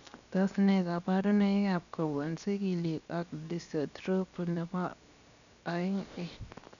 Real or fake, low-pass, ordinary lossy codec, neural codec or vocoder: fake; 7.2 kHz; none; codec, 16 kHz, 0.7 kbps, FocalCodec